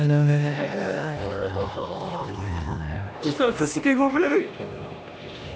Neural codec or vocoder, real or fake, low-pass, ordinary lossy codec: codec, 16 kHz, 1 kbps, X-Codec, HuBERT features, trained on LibriSpeech; fake; none; none